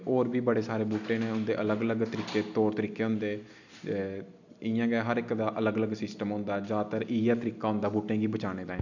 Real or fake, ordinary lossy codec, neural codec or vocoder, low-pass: real; none; none; 7.2 kHz